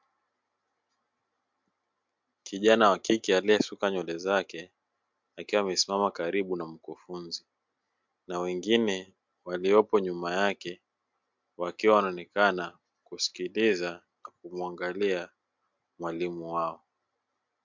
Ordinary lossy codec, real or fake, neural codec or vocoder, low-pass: MP3, 64 kbps; real; none; 7.2 kHz